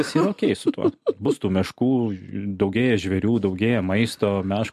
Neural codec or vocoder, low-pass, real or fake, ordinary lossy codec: none; 14.4 kHz; real; MP3, 64 kbps